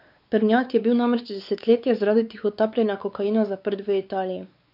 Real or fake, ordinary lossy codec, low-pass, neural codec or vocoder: fake; none; 5.4 kHz; codec, 16 kHz, 4 kbps, X-Codec, WavLM features, trained on Multilingual LibriSpeech